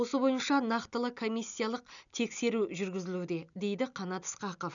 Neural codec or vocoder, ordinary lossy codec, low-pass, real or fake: none; none; 7.2 kHz; real